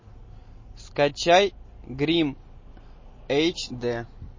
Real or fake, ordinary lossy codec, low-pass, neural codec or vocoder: real; MP3, 32 kbps; 7.2 kHz; none